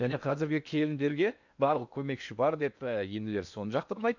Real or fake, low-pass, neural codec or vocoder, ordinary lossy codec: fake; 7.2 kHz; codec, 16 kHz in and 24 kHz out, 0.8 kbps, FocalCodec, streaming, 65536 codes; none